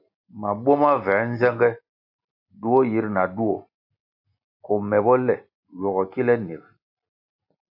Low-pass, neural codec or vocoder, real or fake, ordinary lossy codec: 5.4 kHz; none; real; AAC, 48 kbps